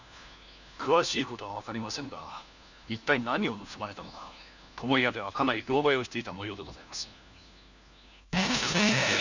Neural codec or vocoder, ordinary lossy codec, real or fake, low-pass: codec, 16 kHz, 1 kbps, FunCodec, trained on LibriTTS, 50 frames a second; none; fake; 7.2 kHz